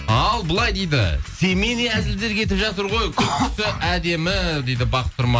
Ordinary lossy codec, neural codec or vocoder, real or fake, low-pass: none; none; real; none